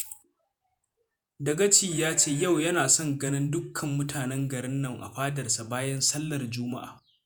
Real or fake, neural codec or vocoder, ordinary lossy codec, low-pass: fake; vocoder, 48 kHz, 128 mel bands, Vocos; none; none